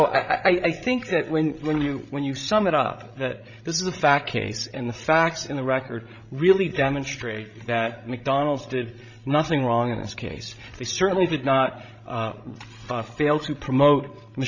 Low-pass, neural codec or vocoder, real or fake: 7.2 kHz; codec, 16 kHz, 16 kbps, FreqCodec, larger model; fake